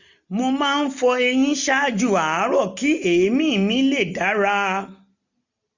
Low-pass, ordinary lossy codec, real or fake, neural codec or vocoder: 7.2 kHz; none; real; none